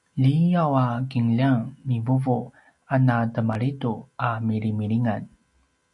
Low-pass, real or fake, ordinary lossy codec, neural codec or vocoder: 10.8 kHz; real; MP3, 64 kbps; none